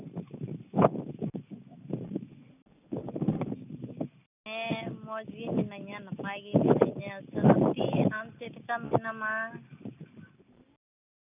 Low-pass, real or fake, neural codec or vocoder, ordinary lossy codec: 3.6 kHz; real; none; none